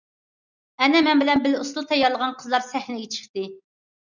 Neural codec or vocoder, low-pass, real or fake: none; 7.2 kHz; real